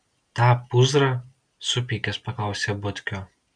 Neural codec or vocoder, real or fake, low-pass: none; real; 9.9 kHz